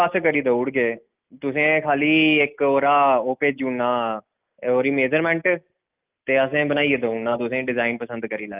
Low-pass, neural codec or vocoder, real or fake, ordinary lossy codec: 3.6 kHz; none; real; Opus, 32 kbps